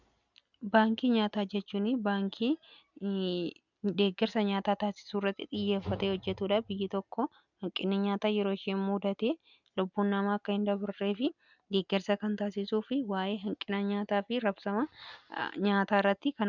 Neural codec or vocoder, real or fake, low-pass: none; real; 7.2 kHz